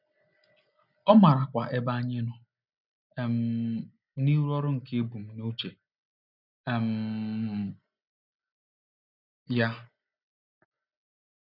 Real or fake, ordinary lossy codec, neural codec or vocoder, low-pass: real; none; none; 5.4 kHz